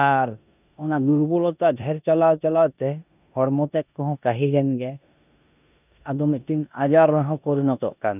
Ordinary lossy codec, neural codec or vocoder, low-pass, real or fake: none; codec, 16 kHz in and 24 kHz out, 0.9 kbps, LongCat-Audio-Codec, four codebook decoder; 3.6 kHz; fake